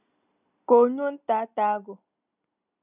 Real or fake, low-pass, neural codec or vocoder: real; 3.6 kHz; none